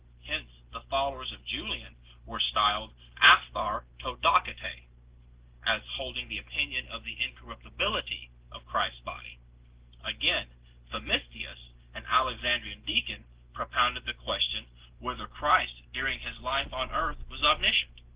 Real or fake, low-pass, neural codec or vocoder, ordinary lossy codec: real; 3.6 kHz; none; Opus, 16 kbps